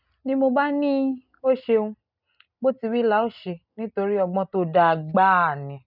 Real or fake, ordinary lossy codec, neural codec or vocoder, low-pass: real; none; none; 5.4 kHz